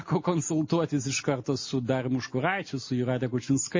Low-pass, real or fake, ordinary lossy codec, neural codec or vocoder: 7.2 kHz; real; MP3, 32 kbps; none